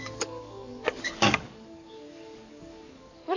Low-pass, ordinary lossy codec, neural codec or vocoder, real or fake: 7.2 kHz; none; codec, 44.1 kHz, 7.8 kbps, DAC; fake